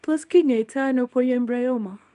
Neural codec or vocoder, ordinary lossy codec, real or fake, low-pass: codec, 24 kHz, 0.9 kbps, WavTokenizer, small release; none; fake; 10.8 kHz